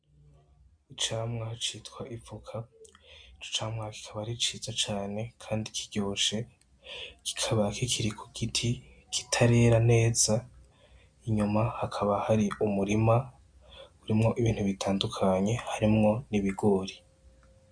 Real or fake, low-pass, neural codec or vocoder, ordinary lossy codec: real; 9.9 kHz; none; MP3, 64 kbps